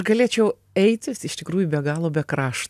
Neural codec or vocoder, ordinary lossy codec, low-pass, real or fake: none; AAC, 96 kbps; 14.4 kHz; real